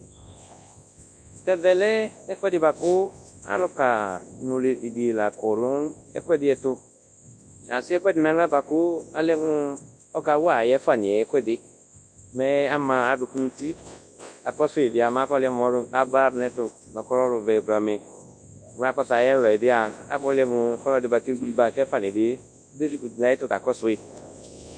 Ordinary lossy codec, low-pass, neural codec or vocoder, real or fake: MP3, 64 kbps; 9.9 kHz; codec, 24 kHz, 0.9 kbps, WavTokenizer, large speech release; fake